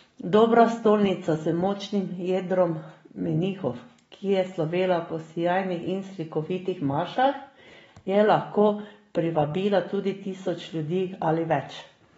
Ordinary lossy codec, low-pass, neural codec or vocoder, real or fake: AAC, 24 kbps; 19.8 kHz; none; real